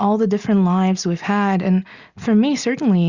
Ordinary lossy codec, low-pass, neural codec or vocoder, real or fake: Opus, 64 kbps; 7.2 kHz; none; real